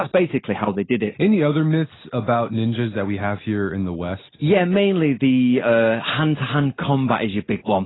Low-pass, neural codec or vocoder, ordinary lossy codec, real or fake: 7.2 kHz; none; AAC, 16 kbps; real